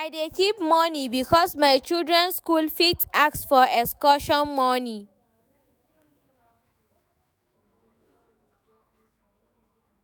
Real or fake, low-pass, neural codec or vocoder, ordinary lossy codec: fake; none; autoencoder, 48 kHz, 128 numbers a frame, DAC-VAE, trained on Japanese speech; none